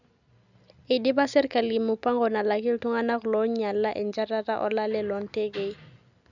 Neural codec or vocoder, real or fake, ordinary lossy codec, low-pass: none; real; none; 7.2 kHz